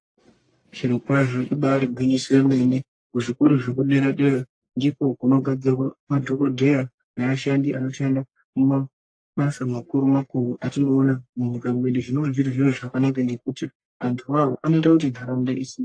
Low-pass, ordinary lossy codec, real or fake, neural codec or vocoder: 9.9 kHz; AAC, 48 kbps; fake; codec, 44.1 kHz, 1.7 kbps, Pupu-Codec